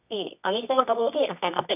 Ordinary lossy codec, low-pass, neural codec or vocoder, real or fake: none; 3.6 kHz; codec, 24 kHz, 0.9 kbps, WavTokenizer, medium music audio release; fake